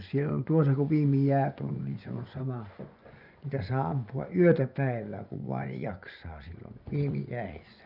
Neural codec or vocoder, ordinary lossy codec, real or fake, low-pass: vocoder, 24 kHz, 100 mel bands, Vocos; none; fake; 5.4 kHz